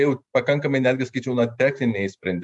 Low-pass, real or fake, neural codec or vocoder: 10.8 kHz; fake; vocoder, 44.1 kHz, 128 mel bands every 512 samples, BigVGAN v2